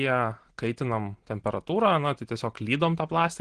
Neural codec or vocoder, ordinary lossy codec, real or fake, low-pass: none; Opus, 16 kbps; real; 9.9 kHz